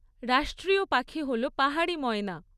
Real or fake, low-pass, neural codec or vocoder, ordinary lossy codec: real; 14.4 kHz; none; none